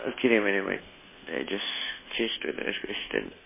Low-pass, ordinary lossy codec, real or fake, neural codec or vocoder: 3.6 kHz; MP3, 16 kbps; fake; codec, 24 kHz, 1.2 kbps, DualCodec